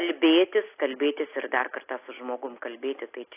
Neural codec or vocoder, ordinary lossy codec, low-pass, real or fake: none; AAC, 24 kbps; 3.6 kHz; real